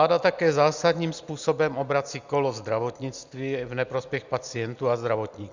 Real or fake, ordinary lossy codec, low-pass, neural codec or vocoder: real; Opus, 64 kbps; 7.2 kHz; none